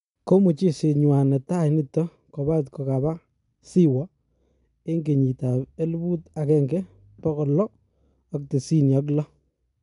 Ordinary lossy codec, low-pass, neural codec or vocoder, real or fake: none; 10.8 kHz; none; real